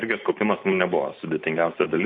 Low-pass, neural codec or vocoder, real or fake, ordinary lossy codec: 7.2 kHz; none; real; MP3, 32 kbps